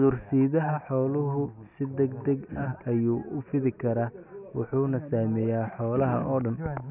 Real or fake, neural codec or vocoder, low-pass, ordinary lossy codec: real; none; 3.6 kHz; none